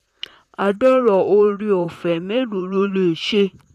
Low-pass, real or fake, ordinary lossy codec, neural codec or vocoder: 14.4 kHz; fake; none; vocoder, 44.1 kHz, 128 mel bands, Pupu-Vocoder